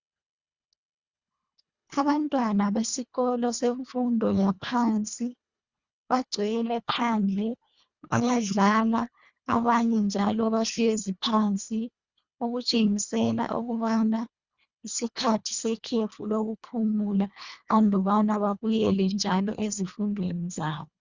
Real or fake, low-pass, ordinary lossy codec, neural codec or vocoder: fake; 7.2 kHz; Opus, 64 kbps; codec, 24 kHz, 1.5 kbps, HILCodec